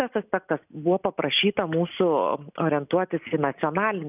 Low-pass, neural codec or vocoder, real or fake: 3.6 kHz; none; real